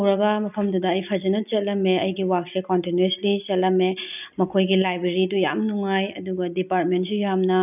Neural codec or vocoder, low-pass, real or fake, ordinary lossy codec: none; 3.6 kHz; real; none